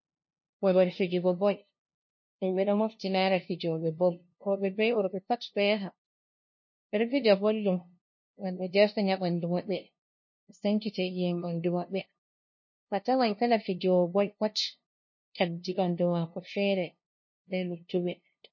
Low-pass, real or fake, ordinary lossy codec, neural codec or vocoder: 7.2 kHz; fake; MP3, 32 kbps; codec, 16 kHz, 0.5 kbps, FunCodec, trained on LibriTTS, 25 frames a second